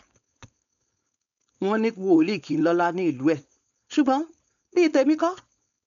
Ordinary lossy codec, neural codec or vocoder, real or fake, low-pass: none; codec, 16 kHz, 4.8 kbps, FACodec; fake; 7.2 kHz